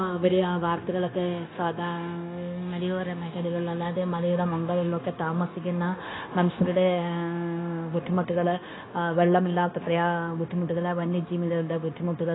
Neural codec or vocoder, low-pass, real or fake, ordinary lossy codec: codec, 16 kHz, 0.9 kbps, LongCat-Audio-Codec; 7.2 kHz; fake; AAC, 16 kbps